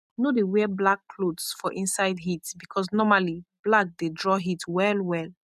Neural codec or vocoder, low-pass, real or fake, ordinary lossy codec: none; 14.4 kHz; real; none